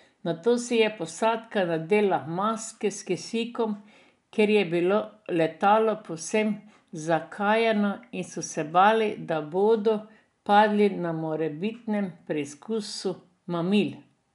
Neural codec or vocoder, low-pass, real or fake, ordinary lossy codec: none; 10.8 kHz; real; none